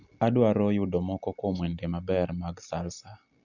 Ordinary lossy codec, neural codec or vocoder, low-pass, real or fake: none; none; 7.2 kHz; real